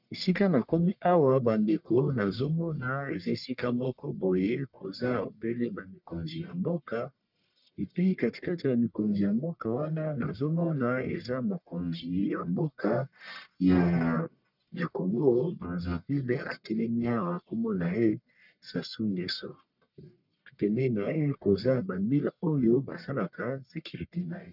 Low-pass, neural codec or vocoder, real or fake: 5.4 kHz; codec, 44.1 kHz, 1.7 kbps, Pupu-Codec; fake